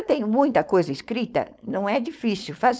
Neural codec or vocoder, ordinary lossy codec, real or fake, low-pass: codec, 16 kHz, 4.8 kbps, FACodec; none; fake; none